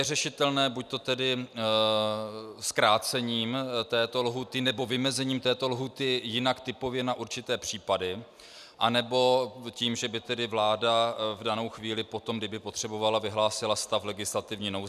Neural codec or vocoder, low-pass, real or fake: none; 14.4 kHz; real